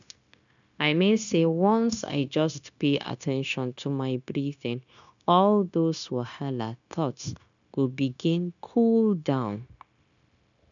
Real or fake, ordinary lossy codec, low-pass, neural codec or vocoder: fake; none; 7.2 kHz; codec, 16 kHz, 0.9 kbps, LongCat-Audio-Codec